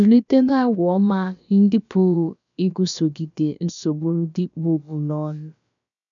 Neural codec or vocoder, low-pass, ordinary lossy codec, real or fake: codec, 16 kHz, about 1 kbps, DyCAST, with the encoder's durations; 7.2 kHz; none; fake